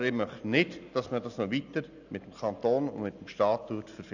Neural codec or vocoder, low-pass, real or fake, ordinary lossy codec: none; 7.2 kHz; real; none